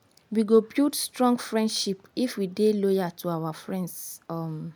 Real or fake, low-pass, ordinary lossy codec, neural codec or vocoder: real; none; none; none